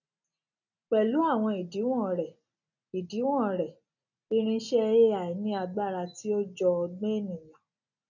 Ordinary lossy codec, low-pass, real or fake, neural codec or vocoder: none; 7.2 kHz; real; none